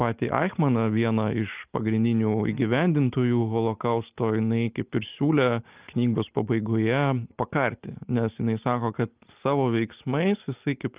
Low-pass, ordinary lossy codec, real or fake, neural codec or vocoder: 3.6 kHz; Opus, 24 kbps; real; none